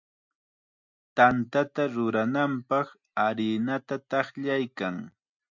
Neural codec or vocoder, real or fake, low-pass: none; real; 7.2 kHz